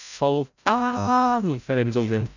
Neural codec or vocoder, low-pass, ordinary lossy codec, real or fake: codec, 16 kHz, 0.5 kbps, FreqCodec, larger model; 7.2 kHz; none; fake